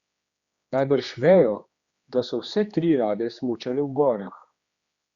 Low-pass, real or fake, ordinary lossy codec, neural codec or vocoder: 7.2 kHz; fake; Opus, 64 kbps; codec, 16 kHz, 2 kbps, X-Codec, HuBERT features, trained on general audio